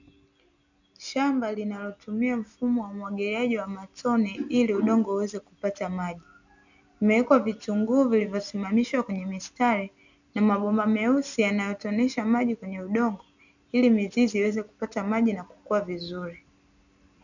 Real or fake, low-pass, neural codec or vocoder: real; 7.2 kHz; none